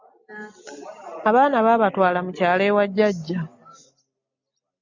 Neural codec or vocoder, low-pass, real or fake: none; 7.2 kHz; real